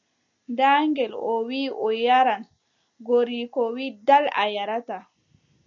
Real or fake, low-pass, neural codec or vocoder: real; 7.2 kHz; none